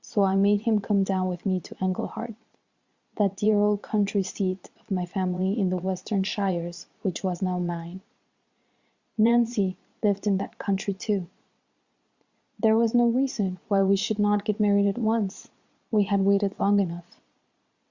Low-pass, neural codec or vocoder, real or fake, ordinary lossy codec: 7.2 kHz; vocoder, 22.05 kHz, 80 mel bands, Vocos; fake; Opus, 64 kbps